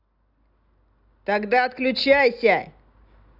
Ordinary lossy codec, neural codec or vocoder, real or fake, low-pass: none; none; real; 5.4 kHz